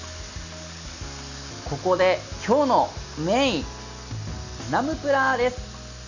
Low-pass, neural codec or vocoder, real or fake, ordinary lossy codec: 7.2 kHz; none; real; none